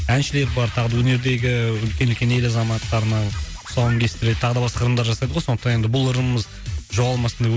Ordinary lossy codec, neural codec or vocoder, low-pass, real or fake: none; none; none; real